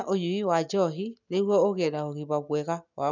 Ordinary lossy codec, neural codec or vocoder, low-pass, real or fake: none; none; 7.2 kHz; real